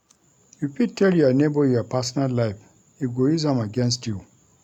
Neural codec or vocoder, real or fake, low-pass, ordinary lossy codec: none; real; 19.8 kHz; none